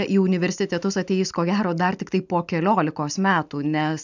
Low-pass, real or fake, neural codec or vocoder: 7.2 kHz; real; none